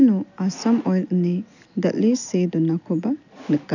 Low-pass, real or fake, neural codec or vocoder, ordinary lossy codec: 7.2 kHz; real; none; none